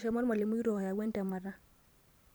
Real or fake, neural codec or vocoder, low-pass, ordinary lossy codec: fake; vocoder, 44.1 kHz, 128 mel bands every 512 samples, BigVGAN v2; none; none